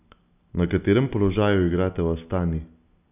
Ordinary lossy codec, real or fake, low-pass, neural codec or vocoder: none; real; 3.6 kHz; none